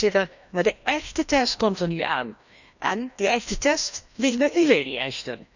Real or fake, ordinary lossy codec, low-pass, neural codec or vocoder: fake; none; 7.2 kHz; codec, 16 kHz, 1 kbps, FreqCodec, larger model